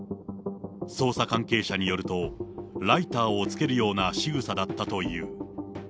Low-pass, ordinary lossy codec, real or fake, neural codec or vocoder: none; none; real; none